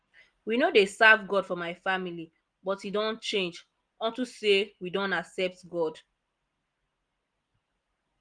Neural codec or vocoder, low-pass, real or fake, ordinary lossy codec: none; 9.9 kHz; real; Opus, 24 kbps